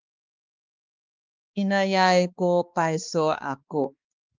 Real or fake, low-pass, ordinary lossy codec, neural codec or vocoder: fake; 7.2 kHz; Opus, 24 kbps; codec, 16 kHz, 2 kbps, X-Codec, HuBERT features, trained on balanced general audio